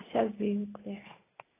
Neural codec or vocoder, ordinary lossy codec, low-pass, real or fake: none; AAC, 16 kbps; 3.6 kHz; real